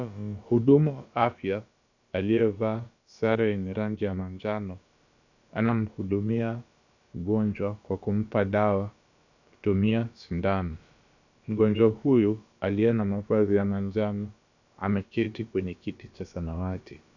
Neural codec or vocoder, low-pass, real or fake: codec, 16 kHz, about 1 kbps, DyCAST, with the encoder's durations; 7.2 kHz; fake